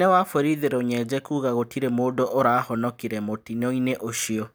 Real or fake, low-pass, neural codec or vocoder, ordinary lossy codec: real; none; none; none